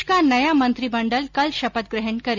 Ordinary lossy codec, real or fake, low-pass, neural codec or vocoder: none; real; 7.2 kHz; none